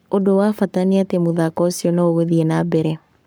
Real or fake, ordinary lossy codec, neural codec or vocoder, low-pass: fake; none; codec, 44.1 kHz, 7.8 kbps, Pupu-Codec; none